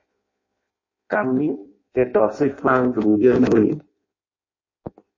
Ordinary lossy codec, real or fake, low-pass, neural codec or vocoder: MP3, 32 kbps; fake; 7.2 kHz; codec, 16 kHz in and 24 kHz out, 0.6 kbps, FireRedTTS-2 codec